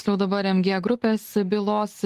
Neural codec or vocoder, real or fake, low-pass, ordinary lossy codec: none; real; 14.4 kHz; Opus, 24 kbps